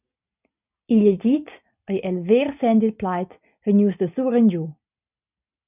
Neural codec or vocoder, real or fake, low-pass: none; real; 3.6 kHz